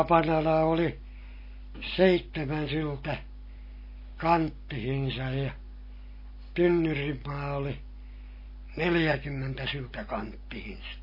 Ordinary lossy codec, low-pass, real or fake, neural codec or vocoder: MP3, 24 kbps; 5.4 kHz; real; none